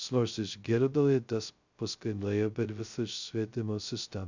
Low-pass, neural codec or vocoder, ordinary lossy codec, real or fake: 7.2 kHz; codec, 16 kHz, 0.2 kbps, FocalCodec; Opus, 64 kbps; fake